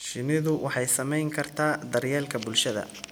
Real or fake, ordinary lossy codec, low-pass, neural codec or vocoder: real; none; none; none